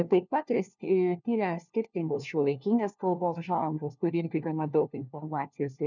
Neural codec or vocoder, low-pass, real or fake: codec, 16 kHz, 1 kbps, FunCodec, trained on LibriTTS, 50 frames a second; 7.2 kHz; fake